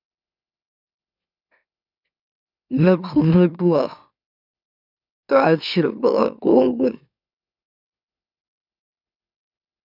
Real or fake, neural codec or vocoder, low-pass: fake; autoencoder, 44.1 kHz, a latent of 192 numbers a frame, MeloTTS; 5.4 kHz